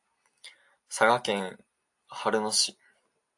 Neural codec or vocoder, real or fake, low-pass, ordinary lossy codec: none; real; 10.8 kHz; AAC, 64 kbps